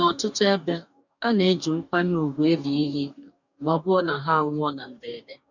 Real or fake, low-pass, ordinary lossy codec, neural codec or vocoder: fake; 7.2 kHz; none; codec, 44.1 kHz, 2.6 kbps, DAC